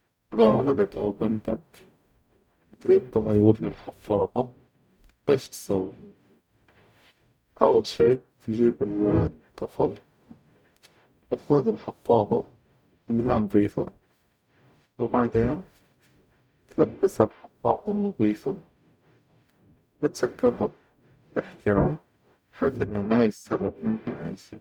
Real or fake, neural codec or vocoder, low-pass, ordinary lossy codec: fake; codec, 44.1 kHz, 0.9 kbps, DAC; 19.8 kHz; none